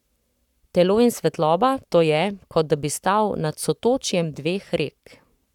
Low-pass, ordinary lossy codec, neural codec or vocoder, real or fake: 19.8 kHz; none; codec, 44.1 kHz, 7.8 kbps, Pupu-Codec; fake